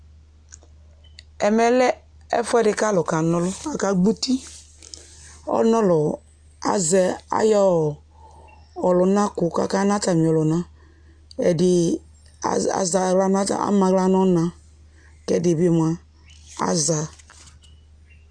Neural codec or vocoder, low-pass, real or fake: none; 9.9 kHz; real